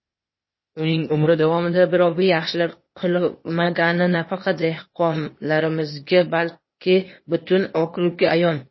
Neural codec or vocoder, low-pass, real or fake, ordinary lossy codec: codec, 16 kHz, 0.8 kbps, ZipCodec; 7.2 kHz; fake; MP3, 24 kbps